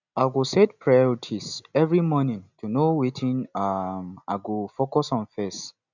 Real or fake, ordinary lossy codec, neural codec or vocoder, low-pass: real; none; none; 7.2 kHz